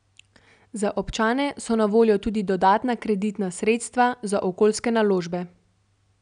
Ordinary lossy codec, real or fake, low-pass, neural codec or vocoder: none; real; 9.9 kHz; none